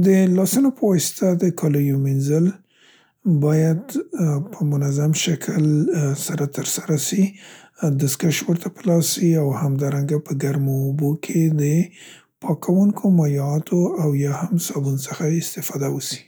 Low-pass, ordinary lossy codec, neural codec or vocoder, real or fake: none; none; none; real